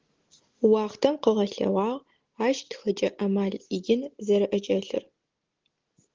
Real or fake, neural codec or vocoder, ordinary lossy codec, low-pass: real; none; Opus, 16 kbps; 7.2 kHz